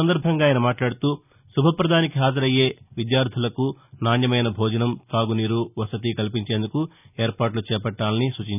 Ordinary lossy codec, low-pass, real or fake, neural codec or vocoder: none; 3.6 kHz; real; none